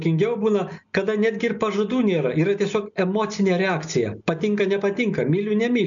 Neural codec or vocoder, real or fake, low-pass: none; real; 7.2 kHz